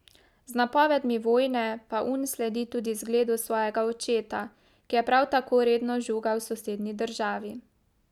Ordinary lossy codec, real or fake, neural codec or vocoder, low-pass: none; real; none; 19.8 kHz